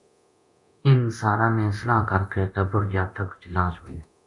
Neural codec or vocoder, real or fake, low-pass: codec, 24 kHz, 0.9 kbps, DualCodec; fake; 10.8 kHz